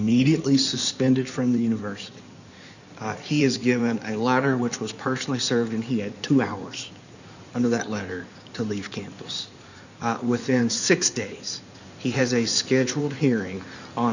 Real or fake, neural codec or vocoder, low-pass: fake; codec, 16 kHz in and 24 kHz out, 2.2 kbps, FireRedTTS-2 codec; 7.2 kHz